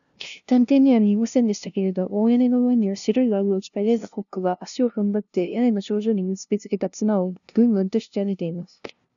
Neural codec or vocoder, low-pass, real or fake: codec, 16 kHz, 0.5 kbps, FunCodec, trained on LibriTTS, 25 frames a second; 7.2 kHz; fake